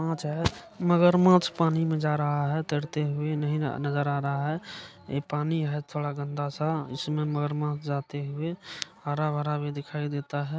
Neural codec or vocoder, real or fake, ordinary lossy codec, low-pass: none; real; none; none